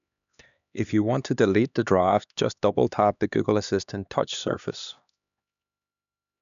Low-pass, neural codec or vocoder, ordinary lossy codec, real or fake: 7.2 kHz; codec, 16 kHz, 2 kbps, X-Codec, HuBERT features, trained on LibriSpeech; none; fake